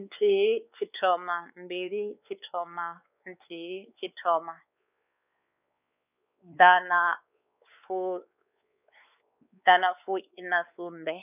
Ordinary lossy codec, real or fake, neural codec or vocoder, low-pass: none; fake; codec, 16 kHz, 4 kbps, X-Codec, WavLM features, trained on Multilingual LibriSpeech; 3.6 kHz